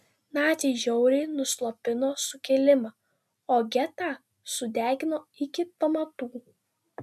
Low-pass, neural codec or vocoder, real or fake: 14.4 kHz; none; real